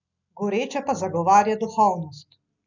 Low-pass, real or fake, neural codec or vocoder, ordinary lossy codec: 7.2 kHz; real; none; none